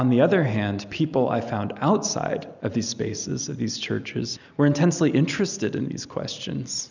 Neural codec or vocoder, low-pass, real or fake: none; 7.2 kHz; real